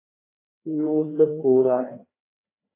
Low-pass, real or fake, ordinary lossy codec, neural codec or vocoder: 3.6 kHz; fake; AAC, 16 kbps; codec, 16 kHz, 2 kbps, FreqCodec, larger model